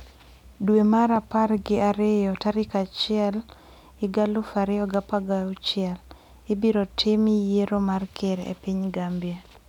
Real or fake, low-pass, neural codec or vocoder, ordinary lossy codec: real; 19.8 kHz; none; none